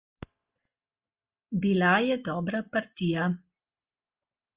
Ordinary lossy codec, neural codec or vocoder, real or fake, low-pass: Opus, 32 kbps; none; real; 3.6 kHz